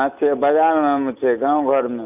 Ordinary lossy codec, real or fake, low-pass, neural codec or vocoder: none; real; 3.6 kHz; none